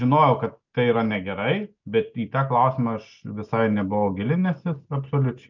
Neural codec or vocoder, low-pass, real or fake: none; 7.2 kHz; real